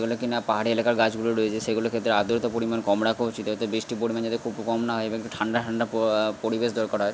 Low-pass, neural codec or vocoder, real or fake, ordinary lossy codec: none; none; real; none